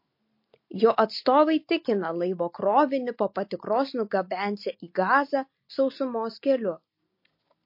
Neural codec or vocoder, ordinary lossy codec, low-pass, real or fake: none; MP3, 32 kbps; 5.4 kHz; real